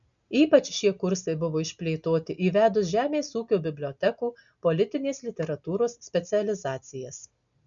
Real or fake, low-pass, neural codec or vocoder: real; 7.2 kHz; none